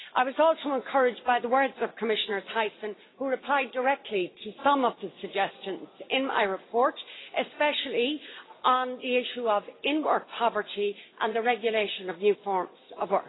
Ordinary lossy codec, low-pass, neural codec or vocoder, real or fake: AAC, 16 kbps; 7.2 kHz; none; real